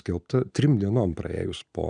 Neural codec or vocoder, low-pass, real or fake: none; 9.9 kHz; real